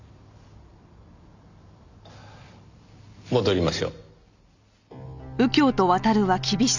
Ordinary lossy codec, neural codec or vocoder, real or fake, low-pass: none; none; real; 7.2 kHz